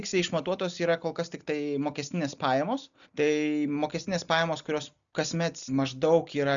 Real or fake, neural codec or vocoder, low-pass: real; none; 7.2 kHz